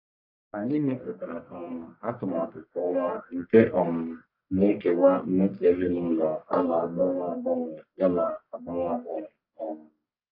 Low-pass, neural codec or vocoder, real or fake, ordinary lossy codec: 5.4 kHz; codec, 44.1 kHz, 1.7 kbps, Pupu-Codec; fake; AAC, 48 kbps